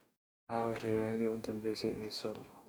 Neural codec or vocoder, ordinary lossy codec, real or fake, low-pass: codec, 44.1 kHz, 2.6 kbps, DAC; none; fake; none